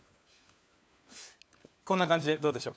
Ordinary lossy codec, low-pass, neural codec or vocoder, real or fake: none; none; codec, 16 kHz, 4 kbps, FunCodec, trained on LibriTTS, 50 frames a second; fake